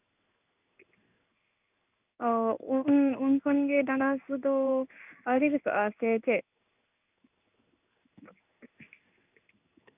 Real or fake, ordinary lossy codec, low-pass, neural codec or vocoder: fake; none; 3.6 kHz; codec, 16 kHz in and 24 kHz out, 1 kbps, XY-Tokenizer